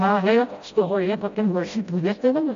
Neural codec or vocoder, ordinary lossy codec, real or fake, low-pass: codec, 16 kHz, 0.5 kbps, FreqCodec, smaller model; AAC, 64 kbps; fake; 7.2 kHz